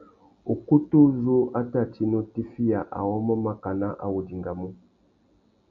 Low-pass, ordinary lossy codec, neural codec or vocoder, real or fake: 7.2 kHz; MP3, 64 kbps; none; real